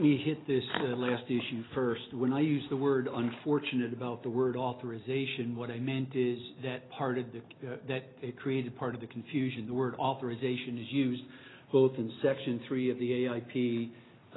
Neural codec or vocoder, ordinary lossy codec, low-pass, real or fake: none; AAC, 16 kbps; 7.2 kHz; real